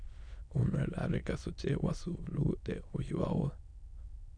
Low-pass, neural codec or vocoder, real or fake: 9.9 kHz; autoencoder, 22.05 kHz, a latent of 192 numbers a frame, VITS, trained on many speakers; fake